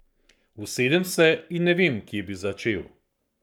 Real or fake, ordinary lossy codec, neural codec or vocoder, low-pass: fake; none; codec, 44.1 kHz, 7.8 kbps, Pupu-Codec; 19.8 kHz